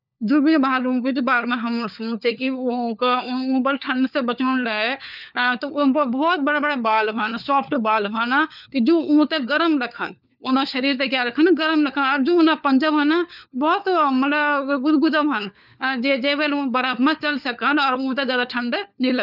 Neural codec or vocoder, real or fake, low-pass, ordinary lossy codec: codec, 16 kHz, 4 kbps, FunCodec, trained on LibriTTS, 50 frames a second; fake; 5.4 kHz; none